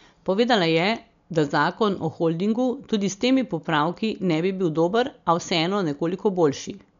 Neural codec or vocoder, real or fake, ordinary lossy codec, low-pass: none; real; MP3, 64 kbps; 7.2 kHz